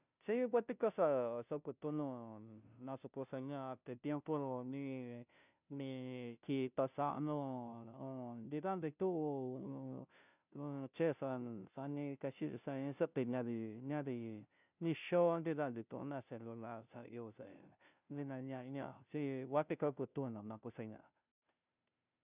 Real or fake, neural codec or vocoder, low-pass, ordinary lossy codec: fake; codec, 16 kHz, 0.5 kbps, FunCodec, trained on Chinese and English, 25 frames a second; 3.6 kHz; none